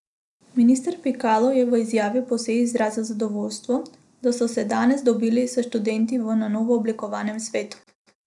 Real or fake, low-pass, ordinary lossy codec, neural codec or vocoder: real; 10.8 kHz; none; none